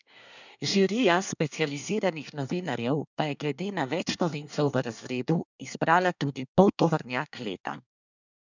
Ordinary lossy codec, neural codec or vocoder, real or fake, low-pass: none; codec, 24 kHz, 1 kbps, SNAC; fake; 7.2 kHz